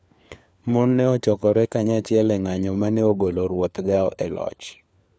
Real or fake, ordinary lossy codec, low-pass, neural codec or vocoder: fake; none; none; codec, 16 kHz, 4 kbps, FunCodec, trained on LibriTTS, 50 frames a second